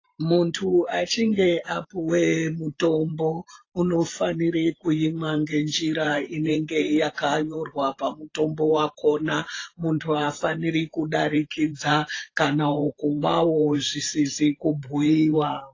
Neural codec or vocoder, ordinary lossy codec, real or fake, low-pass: vocoder, 44.1 kHz, 128 mel bands every 512 samples, BigVGAN v2; AAC, 32 kbps; fake; 7.2 kHz